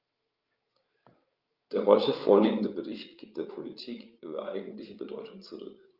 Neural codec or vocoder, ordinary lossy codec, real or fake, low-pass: codec, 16 kHz, 8 kbps, FreqCodec, larger model; Opus, 24 kbps; fake; 5.4 kHz